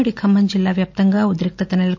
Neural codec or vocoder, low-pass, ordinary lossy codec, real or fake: none; 7.2 kHz; MP3, 48 kbps; real